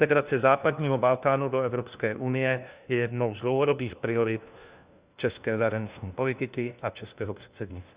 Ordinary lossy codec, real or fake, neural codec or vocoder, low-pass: Opus, 64 kbps; fake; codec, 16 kHz, 1 kbps, FunCodec, trained on LibriTTS, 50 frames a second; 3.6 kHz